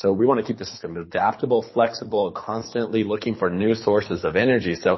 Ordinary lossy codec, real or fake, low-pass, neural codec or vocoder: MP3, 24 kbps; fake; 7.2 kHz; codec, 24 kHz, 6 kbps, HILCodec